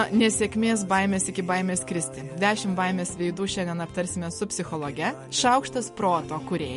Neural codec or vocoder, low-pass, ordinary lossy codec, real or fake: none; 10.8 kHz; MP3, 48 kbps; real